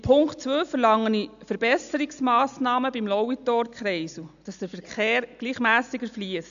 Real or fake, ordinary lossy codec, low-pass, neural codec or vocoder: real; none; 7.2 kHz; none